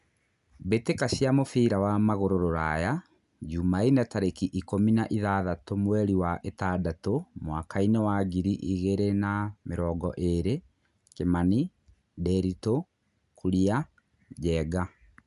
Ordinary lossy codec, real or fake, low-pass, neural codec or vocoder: none; real; 10.8 kHz; none